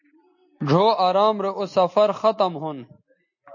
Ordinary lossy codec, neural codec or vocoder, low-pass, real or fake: MP3, 32 kbps; none; 7.2 kHz; real